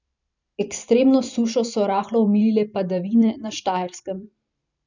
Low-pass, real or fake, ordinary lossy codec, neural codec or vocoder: 7.2 kHz; fake; none; vocoder, 44.1 kHz, 128 mel bands every 512 samples, BigVGAN v2